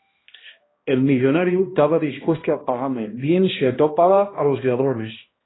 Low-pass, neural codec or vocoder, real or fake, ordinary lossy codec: 7.2 kHz; codec, 16 kHz, 1 kbps, X-Codec, HuBERT features, trained on balanced general audio; fake; AAC, 16 kbps